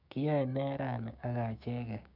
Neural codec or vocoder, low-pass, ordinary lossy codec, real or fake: codec, 16 kHz, 6 kbps, DAC; 5.4 kHz; none; fake